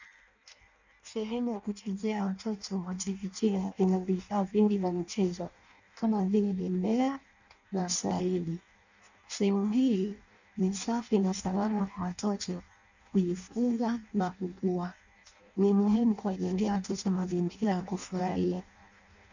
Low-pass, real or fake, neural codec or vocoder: 7.2 kHz; fake; codec, 16 kHz in and 24 kHz out, 0.6 kbps, FireRedTTS-2 codec